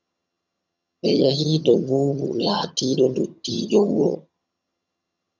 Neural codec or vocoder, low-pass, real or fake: vocoder, 22.05 kHz, 80 mel bands, HiFi-GAN; 7.2 kHz; fake